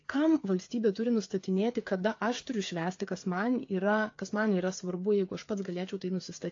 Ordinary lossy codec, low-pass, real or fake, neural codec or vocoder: AAC, 48 kbps; 7.2 kHz; fake; codec, 16 kHz, 8 kbps, FreqCodec, smaller model